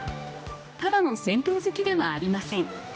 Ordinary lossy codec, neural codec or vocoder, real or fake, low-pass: none; codec, 16 kHz, 1 kbps, X-Codec, HuBERT features, trained on general audio; fake; none